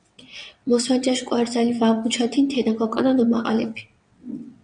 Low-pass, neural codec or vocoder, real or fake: 9.9 kHz; vocoder, 22.05 kHz, 80 mel bands, WaveNeXt; fake